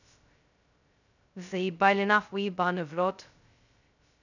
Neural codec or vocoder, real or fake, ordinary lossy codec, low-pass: codec, 16 kHz, 0.2 kbps, FocalCodec; fake; none; 7.2 kHz